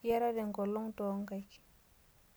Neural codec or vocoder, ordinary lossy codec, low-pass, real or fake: none; none; none; real